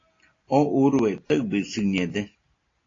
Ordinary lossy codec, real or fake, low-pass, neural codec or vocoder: AAC, 32 kbps; real; 7.2 kHz; none